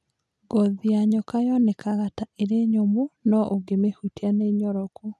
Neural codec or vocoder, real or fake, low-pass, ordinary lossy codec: none; real; none; none